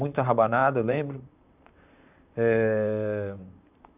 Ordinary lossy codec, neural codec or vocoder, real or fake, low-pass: none; vocoder, 44.1 kHz, 128 mel bands, Pupu-Vocoder; fake; 3.6 kHz